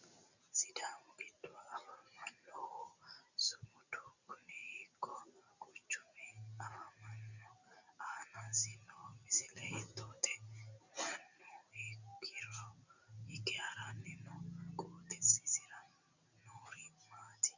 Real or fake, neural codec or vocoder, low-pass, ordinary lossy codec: real; none; 7.2 kHz; Opus, 64 kbps